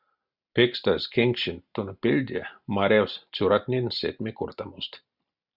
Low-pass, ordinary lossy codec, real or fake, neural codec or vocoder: 5.4 kHz; Opus, 64 kbps; real; none